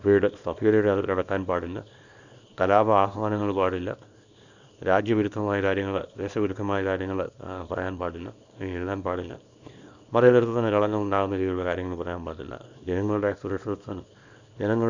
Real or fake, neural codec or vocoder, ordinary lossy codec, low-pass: fake; codec, 24 kHz, 0.9 kbps, WavTokenizer, small release; none; 7.2 kHz